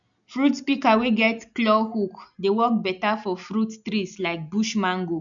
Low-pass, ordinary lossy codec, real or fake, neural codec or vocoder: 7.2 kHz; none; real; none